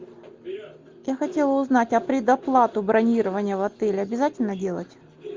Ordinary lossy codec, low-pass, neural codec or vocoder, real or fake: Opus, 32 kbps; 7.2 kHz; none; real